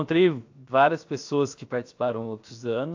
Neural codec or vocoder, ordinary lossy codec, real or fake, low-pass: codec, 16 kHz, 0.7 kbps, FocalCodec; AAC, 48 kbps; fake; 7.2 kHz